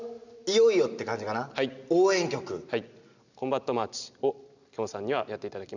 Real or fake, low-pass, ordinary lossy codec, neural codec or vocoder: real; 7.2 kHz; none; none